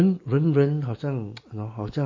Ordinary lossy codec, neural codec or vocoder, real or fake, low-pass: MP3, 32 kbps; none; real; 7.2 kHz